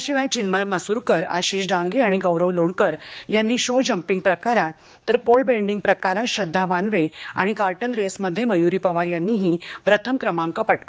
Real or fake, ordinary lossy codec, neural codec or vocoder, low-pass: fake; none; codec, 16 kHz, 2 kbps, X-Codec, HuBERT features, trained on general audio; none